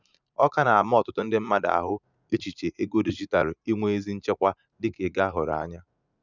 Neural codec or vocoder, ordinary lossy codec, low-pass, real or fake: vocoder, 44.1 kHz, 80 mel bands, Vocos; none; 7.2 kHz; fake